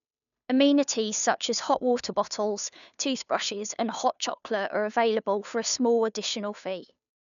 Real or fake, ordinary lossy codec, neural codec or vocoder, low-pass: fake; none; codec, 16 kHz, 2 kbps, FunCodec, trained on Chinese and English, 25 frames a second; 7.2 kHz